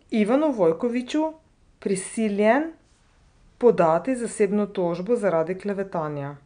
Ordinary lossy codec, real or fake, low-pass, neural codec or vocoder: none; real; 9.9 kHz; none